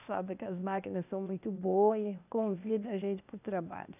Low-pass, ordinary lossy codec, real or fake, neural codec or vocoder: 3.6 kHz; none; fake; codec, 16 kHz, 0.8 kbps, ZipCodec